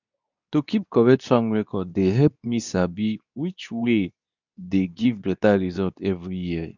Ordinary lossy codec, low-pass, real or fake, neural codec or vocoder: none; 7.2 kHz; fake; codec, 24 kHz, 0.9 kbps, WavTokenizer, medium speech release version 2